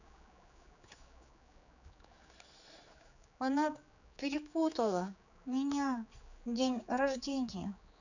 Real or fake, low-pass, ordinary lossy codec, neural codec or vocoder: fake; 7.2 kHz; none; codec, 16 kHz, 4 kbps, X-Codec, HuBERT features, trained on general audio